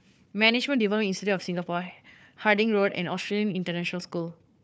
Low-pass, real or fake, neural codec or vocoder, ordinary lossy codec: none; fake; codec, 16 kHz, 4 kbps, FunCodec, trained on Chinese and English, 50 frames a second; none